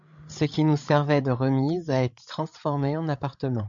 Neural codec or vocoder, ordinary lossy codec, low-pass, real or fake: codec, 16 kHz, 16 kbps, FreqCodec, larger model; MP3, 64 kbps; 7.2 kHz; fake